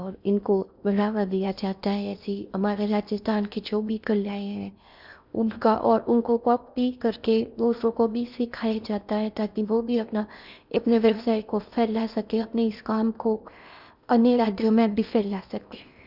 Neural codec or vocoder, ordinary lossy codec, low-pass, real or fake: codec, 16 kHz in and 24 kHz out, 0.6 kbps, FocalCodec, streaming, 4096 codes; none; 5.4 kHz; fake